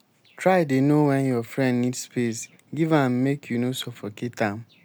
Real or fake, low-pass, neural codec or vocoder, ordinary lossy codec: real; none; none; none